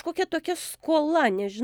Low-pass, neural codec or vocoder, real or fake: 19.8 kHz; none; real